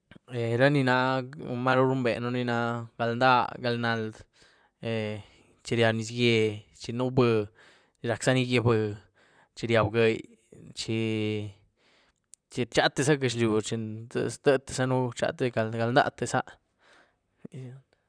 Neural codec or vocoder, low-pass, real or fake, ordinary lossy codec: vocoder, 44.1 kHz, 128 mel bands, Pupu-Vocoder; 9.9 kHz; fake; none